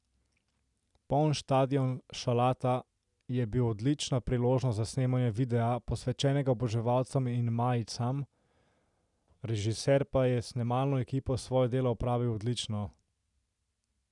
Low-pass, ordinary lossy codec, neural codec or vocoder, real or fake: 10.8 kHz; none; none; real